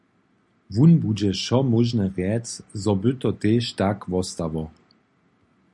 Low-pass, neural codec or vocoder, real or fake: 10.8 kHz; none; real